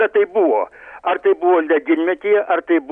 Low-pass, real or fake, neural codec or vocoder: 9.9 kHz; real; none